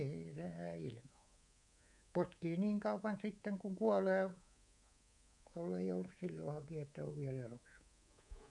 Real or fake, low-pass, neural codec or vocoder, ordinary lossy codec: fake; 10.8 kHz; codec, 24 kHz, 3.1 kbps, DualCodec; none